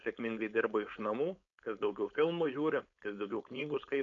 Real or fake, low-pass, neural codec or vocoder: fake; 7.2 kHz; codec, 16 kHz, 4.8 kbps, FACodec